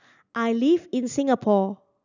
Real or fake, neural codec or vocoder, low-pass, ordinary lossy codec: real; none; 7.2 kHz; none